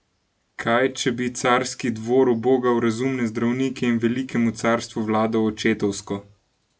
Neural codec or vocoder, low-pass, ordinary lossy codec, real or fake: none; none; none; real